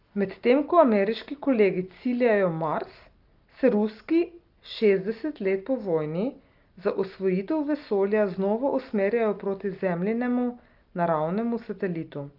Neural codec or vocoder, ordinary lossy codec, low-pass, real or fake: none; Opus, 32 kbps; 5.4 kHz; real